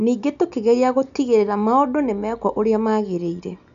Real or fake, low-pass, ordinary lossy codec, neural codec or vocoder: real; 7.2 kHz; none; none